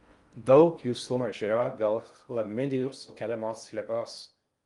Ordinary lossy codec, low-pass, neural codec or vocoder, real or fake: Opus, 32 kbps; 10.8 kHz; codec, 16 kHz in and 24 kHz out, 0.6 kbps, FocalCodec, streaming, 4096 codes; fake